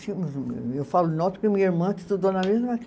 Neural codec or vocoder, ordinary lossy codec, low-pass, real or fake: none; none; none; real